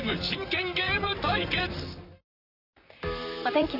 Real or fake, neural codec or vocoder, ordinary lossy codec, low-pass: fake; vocoder, 44.1 kHz, 128 mel bands, Pupu-Vocoder; none; 5.4 kHz